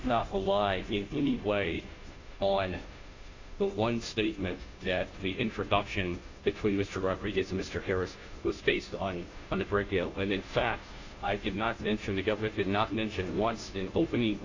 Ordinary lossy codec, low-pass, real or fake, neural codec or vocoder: AAC, 48 kbps; 7.2 kHz; fake; codec, 16 kHz, 0.5 kbps, FunCodec, trained on Chinese and English, 25 frames a second